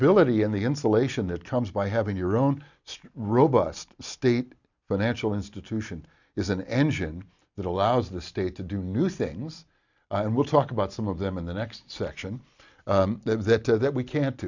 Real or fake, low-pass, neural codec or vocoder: real; 7.2 kHz; none